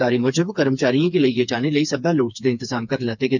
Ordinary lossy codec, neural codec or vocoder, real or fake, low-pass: none; codec, 16 kHz, 4 kbps, FreqCodec, smaller model; fake; 7.2 kHz